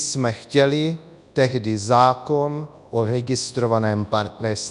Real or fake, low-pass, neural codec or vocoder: fake; 10.8 kHz; codec, 24 kHz, 0.9 kbps, WavTokenizer, large speech release